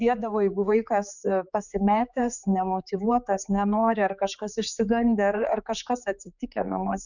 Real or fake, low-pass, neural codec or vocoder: fake; 7.2 kHz; codec, 16 kHz, 4 kbps, X-Codec, HuBERT features, trained on general audio